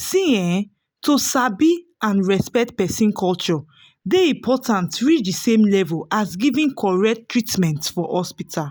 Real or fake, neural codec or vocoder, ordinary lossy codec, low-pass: real; none; none; none